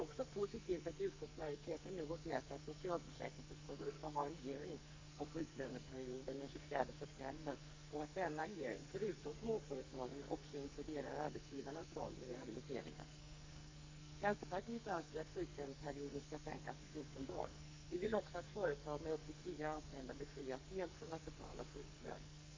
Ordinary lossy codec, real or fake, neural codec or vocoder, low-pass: MP3, 64 kbps; fake; codec, 44.1 kHz, 2.6 kbps, SNAC; 7.2 kHz